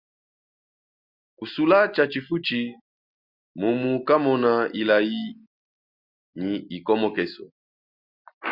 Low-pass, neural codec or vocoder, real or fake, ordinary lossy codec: 5.4 kHz; none; real; Opus, 64 kbps